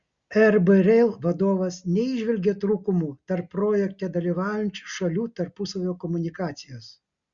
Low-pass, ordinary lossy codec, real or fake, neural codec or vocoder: 7.2 kHz; Opus, 64 kbps; real; none